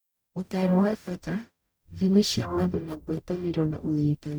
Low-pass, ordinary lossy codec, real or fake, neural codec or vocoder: none; none; fake; codec, 44.1 kHz, 0.9 kbps, DAC